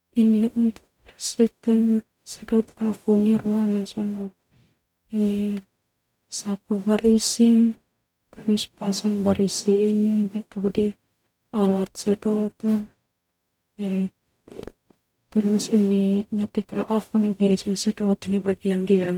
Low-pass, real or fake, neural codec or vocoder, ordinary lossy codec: 19.8 kHz; fake; codec, 44.1 kHz, 0.9 kbps, DAC; none